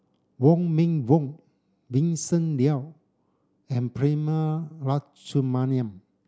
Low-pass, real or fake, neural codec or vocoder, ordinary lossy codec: none; real; none; none